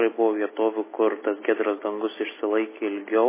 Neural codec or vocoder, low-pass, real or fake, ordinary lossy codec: none; 3.6 kHz; real; MP3, 16 kbps